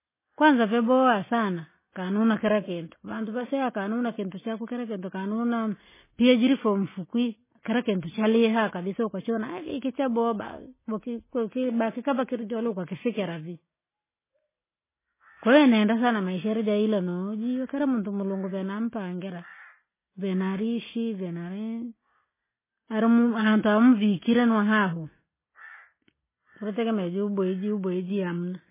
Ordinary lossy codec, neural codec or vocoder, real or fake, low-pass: MP3, 16 kbps; none; real; 3.6 kHz